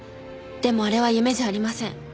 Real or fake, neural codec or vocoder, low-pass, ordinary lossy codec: real; none; none; none